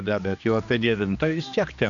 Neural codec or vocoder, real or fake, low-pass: codec, 16 kHz, 2 kbps, X-Codec, HuBERT features, trained on general audio; fake; 7.2 kHz